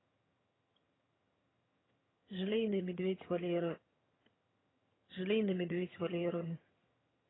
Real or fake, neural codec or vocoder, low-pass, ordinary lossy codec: fake; vocoder, 22.05 kHz, 80 mel bands, HiFi-GAN; 7.2 kHz; AAC, 16 kbps